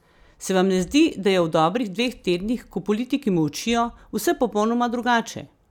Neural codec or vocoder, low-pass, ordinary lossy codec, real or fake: none; 19.8 kHz; none; real